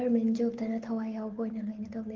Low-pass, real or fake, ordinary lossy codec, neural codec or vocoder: 7.2 kHz; real; Opus, 16 kbps; none